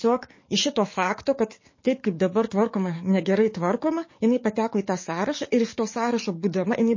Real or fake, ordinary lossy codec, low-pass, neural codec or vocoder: fake; MP3, 32 kbps; 7.2 kHz; codec, 44.1 kHz, 7.8 kbps, DAC